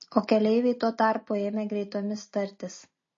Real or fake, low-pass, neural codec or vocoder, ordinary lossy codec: real; 7.2 kHz; none; MP3, 32 kbps